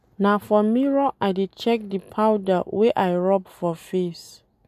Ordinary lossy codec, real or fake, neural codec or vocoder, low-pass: none; real; none; 14.4 kHz